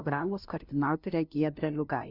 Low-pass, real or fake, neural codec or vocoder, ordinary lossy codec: 5.4 kHz; fake; codec, 16 kHz, 0.5 kbps, FunCodec, trained on LibriTTS, 25 frames a second; Opus, 64 kbps